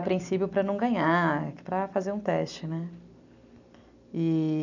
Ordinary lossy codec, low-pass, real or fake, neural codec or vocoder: none; 7.2 kHz; real; none